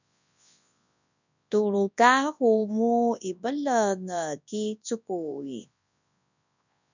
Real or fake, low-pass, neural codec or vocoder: fake; 7.2 kHz; codec, 24 kHz, 0.9 kbps, WavTokenizer, large speech release